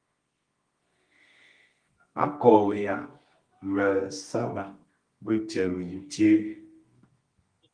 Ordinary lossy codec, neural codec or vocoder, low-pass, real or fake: Opus, 24 kbps; codec, 24 kHz, 0.9 kbps, WavTokenizer, medium music audio release; 9.9 kHz; fake